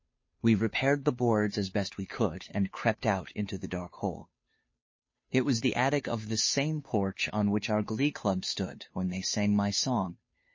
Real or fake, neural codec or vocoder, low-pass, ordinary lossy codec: fake; codec, 16 kHz, 2 kbps, FunCodec, trained on Chinese and English, 25 frames a second; 7.2 kHz; MP3, 32 kbps